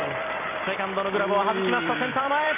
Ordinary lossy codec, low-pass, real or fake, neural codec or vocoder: MP3, 32 kbps; 3.6 kHz; fake; vocoder, 44.1 kHz, 128 mel bands every 256 samples, BigVGAN v2